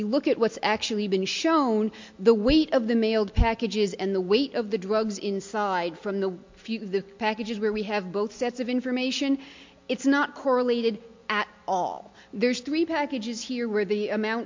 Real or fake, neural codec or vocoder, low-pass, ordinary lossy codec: real; none; 7.2 kHz; MP3, 48 kbps